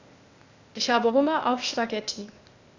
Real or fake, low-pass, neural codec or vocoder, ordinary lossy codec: fake; 7.2 kHz; codec, 16 kHz, 0.8 kbps, ZipCodec; AAC, 48 kbps